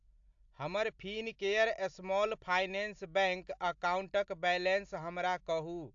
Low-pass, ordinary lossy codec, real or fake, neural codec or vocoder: 7.2 kHz; AAC, 64 kbps; real; none